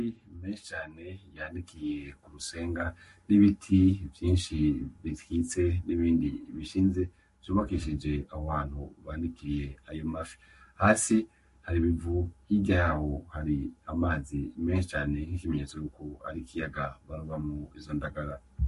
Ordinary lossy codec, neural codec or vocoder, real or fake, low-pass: MP3, 48 kbps; codec, 44.1 kHz, 7.8 kbps, Pupu-Codec; fake; 14.4 kHz